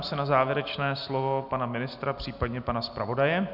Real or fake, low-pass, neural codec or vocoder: real; 5.4 kHz; none